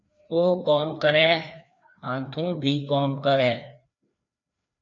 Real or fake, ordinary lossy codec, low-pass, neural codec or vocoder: fake; MP3, 64 kbps; 7.2 kHz; codec, 16 kHz, 2 kbps, FreqCodec, larger model